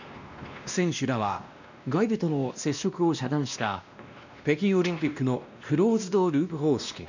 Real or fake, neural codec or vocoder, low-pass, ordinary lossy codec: fake; codec, 16 kHz, 1 kbps, X-Codec, WavLM features, trained on Multilingual LibriSpeech; 7.2 kHz; none